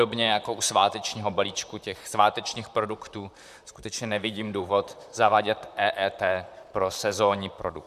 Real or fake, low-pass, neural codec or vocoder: fake; 14.4 kHz; vocoder, 44.1 kHz, 128 mel bands, Pupu-Vocoder